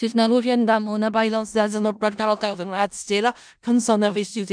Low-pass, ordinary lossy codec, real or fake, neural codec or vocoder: 9.9 kHz; none; fake; codec, 16 kHz in and 24 kHz out, 0.4 kbps, LongCat-Audio-Codec, four codebook decoder